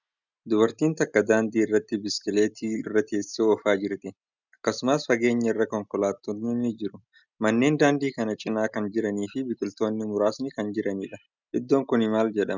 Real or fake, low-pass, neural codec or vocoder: real; 7.2 kHz; none